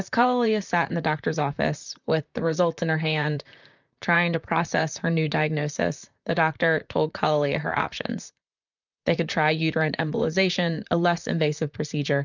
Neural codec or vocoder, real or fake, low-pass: vocoder, 44.1 kHz, 128 mel bands, Pupu-Vocoder; fake; 7.2 kHz